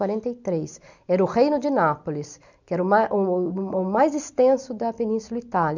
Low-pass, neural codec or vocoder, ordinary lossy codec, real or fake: 7.2 kHz; none; none; real